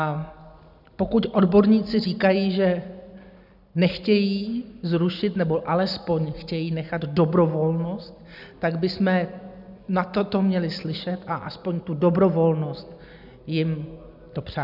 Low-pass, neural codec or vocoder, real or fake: 5.4 kHz; none; real